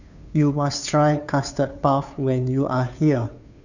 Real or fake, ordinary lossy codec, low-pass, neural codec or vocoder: fake; none; 7.2 kHz; codec, 16 kHz, 2 kbps, FunCodec, trained on Chinese and English, 25 frames a second